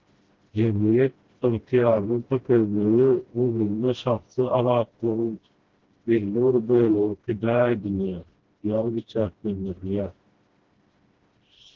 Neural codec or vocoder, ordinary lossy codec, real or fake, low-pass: codec, 16 kHz, 1 kbps, FreqCodec, smaller model; Opus, 16 kbps; fake; 7.2 kHz